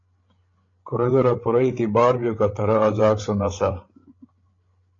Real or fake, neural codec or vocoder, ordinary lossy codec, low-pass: fake; codec, 16 kHz, 16 kbps, FreqCodec, larger model; AAC, 32 kbps; 7.2 kHz